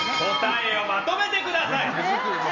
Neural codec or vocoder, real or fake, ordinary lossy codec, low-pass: none; real; none; 7.2 kHz